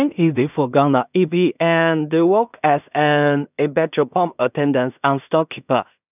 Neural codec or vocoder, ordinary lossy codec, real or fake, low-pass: codec, 16 kHz in and 24 kHz out, 0.4 kbps, LongCat-Audio-Codec, two codebook decoder; none; fake; 3.6 kHz